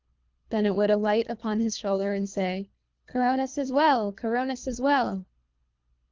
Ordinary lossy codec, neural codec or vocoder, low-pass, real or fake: Opus, 32 kbps; codec, 24 kHz, 3 kbps, HILCodec; 7.2 kHz; fake